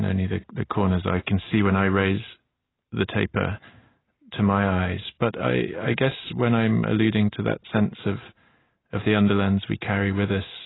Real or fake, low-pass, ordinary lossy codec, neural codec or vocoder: real; 7.2 kHz; AAC, 16 kbps; none